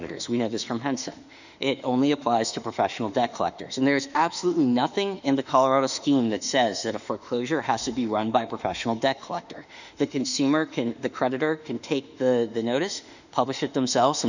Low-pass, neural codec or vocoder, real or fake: 7.2 kHz; autoencoder, 48 kHz, 32 numbers a frame, DAC-VAE, trained on Japanese speech; fake